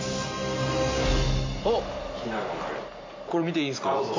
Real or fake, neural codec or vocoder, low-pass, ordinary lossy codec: real; none; 7.2 kHz; MP3, 48 kbps